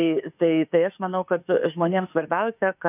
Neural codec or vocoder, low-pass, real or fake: autoencoder, 48 kHz, 32 numbers a frame, DAC-VAE, trained on Japanese speech; 3.6 kHz; fake